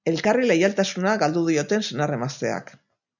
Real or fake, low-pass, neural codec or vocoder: real; 7.2 kHz; none